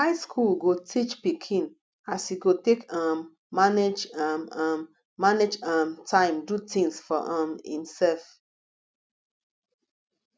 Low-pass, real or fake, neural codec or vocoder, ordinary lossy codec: none; real; none; none